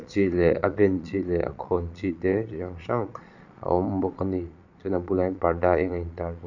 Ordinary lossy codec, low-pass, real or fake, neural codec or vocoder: none; 7.2 kHz; fake; vocoder, 22.05 kHz, 80 mel bands, Vocos